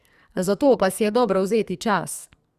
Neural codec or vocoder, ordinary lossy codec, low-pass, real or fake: codec, 44.1 kHz, 2.6 kbps, SNAC; Opus, 64 kbps; 14.4 kHz; fake